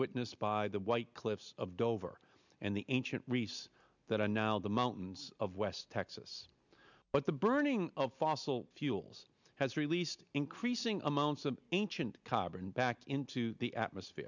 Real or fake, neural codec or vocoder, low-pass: real; none; 7.2 kHz